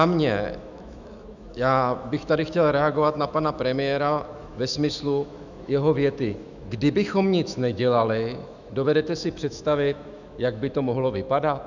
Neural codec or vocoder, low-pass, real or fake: autoencoder, 48 kHz, 128 numbers a frame, DAC-VAE, trained on Japanese speech; 7.2 kHz; fake